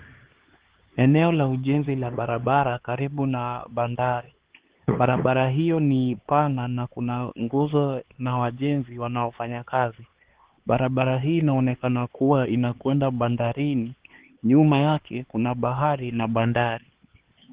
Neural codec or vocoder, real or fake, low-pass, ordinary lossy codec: codec, 16 kHz, 4 kbps, X-Codec, HuBERT features, trained on LibriSpeech; fake; 3.6 kHz; Opus, 16 kbps